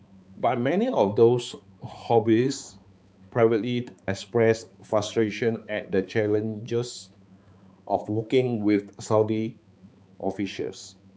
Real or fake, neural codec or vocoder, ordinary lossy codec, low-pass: fake; codec, 16 kHz, 4 kbps, X-Codec, HuBERT features, trained on balanced general audio; none; none